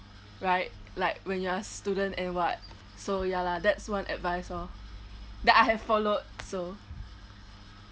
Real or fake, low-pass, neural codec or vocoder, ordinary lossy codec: real; none; none; none